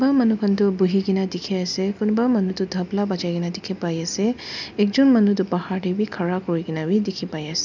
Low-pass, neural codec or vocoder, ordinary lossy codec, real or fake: 7.2 kHz; none; none; real